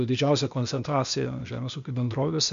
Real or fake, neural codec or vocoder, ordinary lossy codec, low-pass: fake; codec, 16 kHz, 0.8 kbps, ZipCodec; MP3, 64 kbps; 7.2 kHz